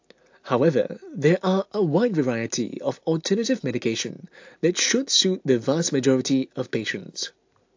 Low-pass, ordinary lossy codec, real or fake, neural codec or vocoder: 7.2 kHz; AAC, 48 kbps; real; none